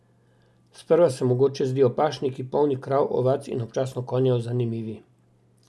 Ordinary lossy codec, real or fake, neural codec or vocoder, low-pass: none; real; none; none